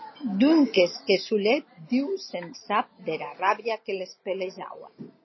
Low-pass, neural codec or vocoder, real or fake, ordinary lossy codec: 7.2 kHz; none; real; MP3, 24 kbps